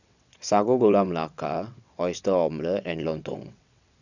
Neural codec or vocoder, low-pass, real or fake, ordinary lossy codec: vocoder, 22.05 kHz, 80 mel bands, WaveNeXt; 7.2 kHz; fake; none